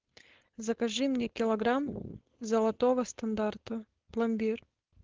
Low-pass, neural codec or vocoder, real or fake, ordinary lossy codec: 7.2 kHz; codec, 16 kHz, 4.8 kbps, FACodec; fake; Opus, 16 kbps